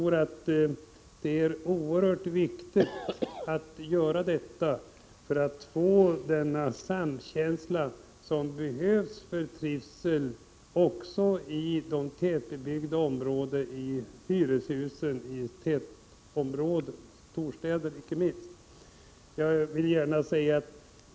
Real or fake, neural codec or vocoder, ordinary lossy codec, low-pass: real; none; none; none